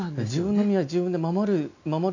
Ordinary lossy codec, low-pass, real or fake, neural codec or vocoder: none; 7.2 kHz; real; none